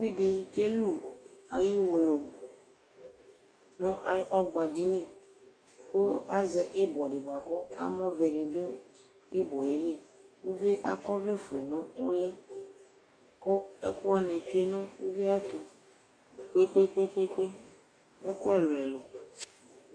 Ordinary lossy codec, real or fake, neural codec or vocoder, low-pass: MP3, 64 kbps; fake; codec, 44.1 kHz, 2.6 kbps, DAC; 9.9 kHz